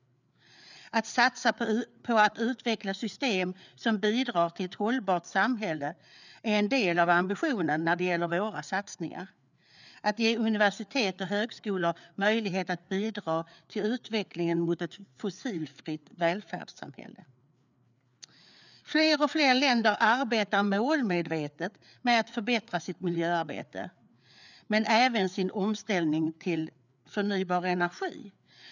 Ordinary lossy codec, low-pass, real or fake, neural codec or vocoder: none; 7.2 kHz; fake; codec, 16 kHz, 4 kbps, FreqCodec, larger model